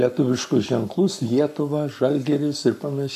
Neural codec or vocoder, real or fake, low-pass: codec, 44.1 kHz, 7.8 kbps, Pupu-Codec; fake; 14.4 kHz